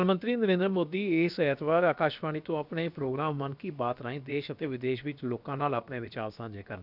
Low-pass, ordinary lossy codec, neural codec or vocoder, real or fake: 5.4 kHz; none; codec, 16 kHz, about 1 kbps, DyCAST, with the encoder's durations; fake